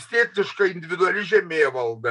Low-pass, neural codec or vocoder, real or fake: 10.8 kHz; none; real